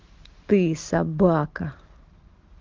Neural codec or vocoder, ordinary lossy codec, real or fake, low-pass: none; Opus, 16 kbps; real; 7.2 kHz